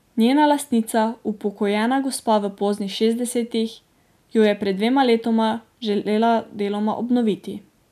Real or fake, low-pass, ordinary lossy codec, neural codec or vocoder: real; 14.4 kHz; none; none